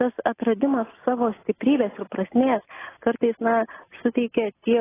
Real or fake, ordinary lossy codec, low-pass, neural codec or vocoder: real; AAC, 16 kbps; 3.6 kHz; none